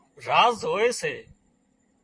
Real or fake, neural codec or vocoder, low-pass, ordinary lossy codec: fake; vocoder, 44.1 kHz, 128 mel bands, Pupu-Vocoder; 9.9 kHz; MP3, 48 kbps